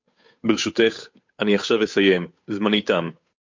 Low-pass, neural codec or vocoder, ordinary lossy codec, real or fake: 7.2 kHz; codec, 16 kHz, 8 kbps, FunCodec, trained on Chinese and English, 25 frames a second; MP3, 48 kbps; fake